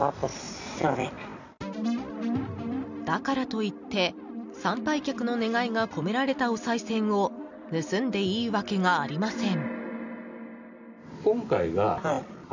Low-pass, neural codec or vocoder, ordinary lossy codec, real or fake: 7.2 kHz; none; none; real